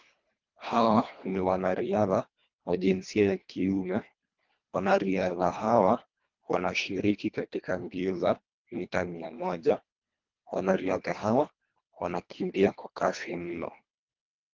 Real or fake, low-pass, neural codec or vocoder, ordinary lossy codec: fake; 7.2 kHz; codec, 24 kHz, 1.5 kbps, HILCodec; Opus, 24 kbps